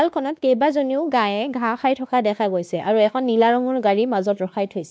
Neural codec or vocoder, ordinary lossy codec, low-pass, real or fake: codec, 16 kHz, 2 kbps, X-Codec, WavLM features, trained on Multilingual LibriSpeech; none; none; fake